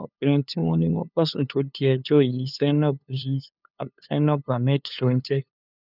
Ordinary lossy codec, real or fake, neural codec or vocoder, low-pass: none; fake; codec, 16 kHz, 2 kbps, FunCodec, trained on LibriTTS, 25 frames a second; 5.4 kHz